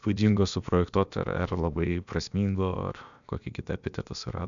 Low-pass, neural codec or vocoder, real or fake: 7.2 kHz; codec, 16 kHz, about 1 kbps, DyCAST, with the encoder's durations; fake